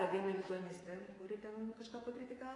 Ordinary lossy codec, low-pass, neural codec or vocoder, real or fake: AAC, 32 kbps; 10.8 kHz; codec, 24 kHz, 3.1 kbps, DualCodec; fake